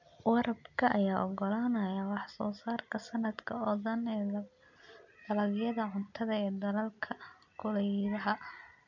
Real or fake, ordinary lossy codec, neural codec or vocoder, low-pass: real; none; none; 7.2 kHz